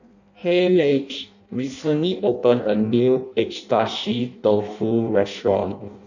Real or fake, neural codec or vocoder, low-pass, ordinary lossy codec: fake; codec, 16 kHz in and 24 kHz out, 0.6 kbps, FireRedTTS-2 codec; 7.2 kHz; none